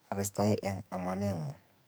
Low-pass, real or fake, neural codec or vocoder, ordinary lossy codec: none; fake; codec, 44.1 kHz, 2.6 kbps, SNAC; none